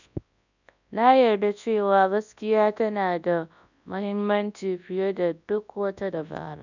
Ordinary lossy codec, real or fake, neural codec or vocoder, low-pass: none; fake; codec, 24 kHz, 0.9 kbps, WavTokenizer, large speech release; 7.2 kHz